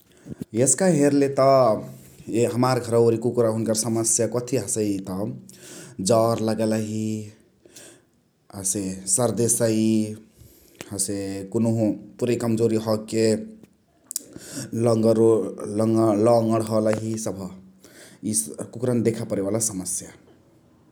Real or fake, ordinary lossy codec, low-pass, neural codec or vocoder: real; none; none; none